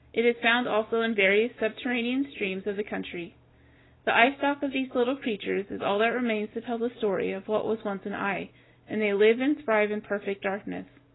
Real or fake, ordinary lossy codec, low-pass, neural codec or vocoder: real; AAC, 16 kbps; 7.2 kHz; none